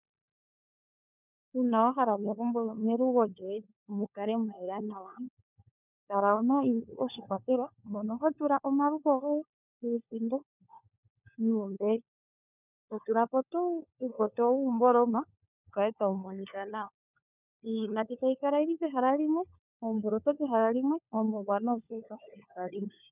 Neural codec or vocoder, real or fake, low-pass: codec, 16 kHz, 4 kbps, FunCodec, trained on LibriTTS, 50 frames a second; fake; 3.6 kHz